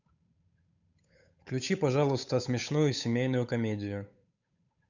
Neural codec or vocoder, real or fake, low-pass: codec, 16 kHz, 8 kbps, FunCodec, trained on Chinese and English, 25 frames a second; fake; 7.2 kHz